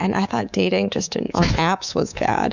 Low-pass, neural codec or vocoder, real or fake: 7.2 kHz; codec, 24 kHz, 3.1 kbps, DualCodec; fake